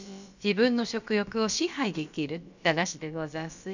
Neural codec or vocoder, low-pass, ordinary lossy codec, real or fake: codec, 16 kHz, about 1 kbps, DyCAST, with the encoder's durations; 7.2 kHz; none; fake